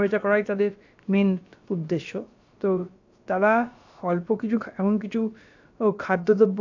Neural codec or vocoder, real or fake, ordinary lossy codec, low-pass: codec, 16 kHz, about 1 kbps, DyCAST, with the encoder's durations; fake; AAC, 48 kbps; 7.2 kHz